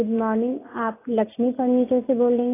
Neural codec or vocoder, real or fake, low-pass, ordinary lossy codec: none; real; 3.6 kHz; AAC, 16 kbps